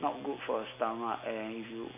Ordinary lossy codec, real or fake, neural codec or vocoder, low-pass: none; real; none; 3.6 kHz